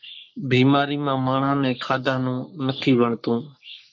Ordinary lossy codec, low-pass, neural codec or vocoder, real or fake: MP3, 48 kbps; 7.2 kHz; codec, 44.1 kHz, 3.4 kbps, Pupu-Codec; fake